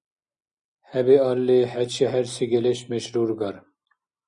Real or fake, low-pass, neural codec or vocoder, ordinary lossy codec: real; 9.9 kHz; none; Opus, 64 kbps